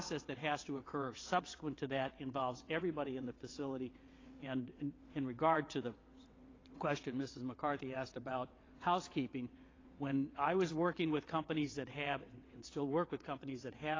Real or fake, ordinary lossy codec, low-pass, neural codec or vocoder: fake; AAC, 32 kbps; 7.2 kHz; vocoder, 22.05 kHz, 80 mel bands, WaveNeXt